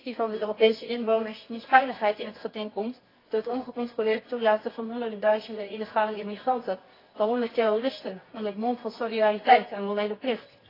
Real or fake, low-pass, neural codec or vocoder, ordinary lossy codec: fake; 5.4 kHz; codec, 24 kHz, 0.9 kbps, WavTokenizer, medium music audio release; AAC, 24 kbps